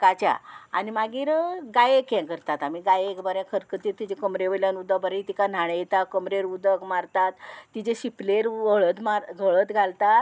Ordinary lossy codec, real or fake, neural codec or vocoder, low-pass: none; real; none; none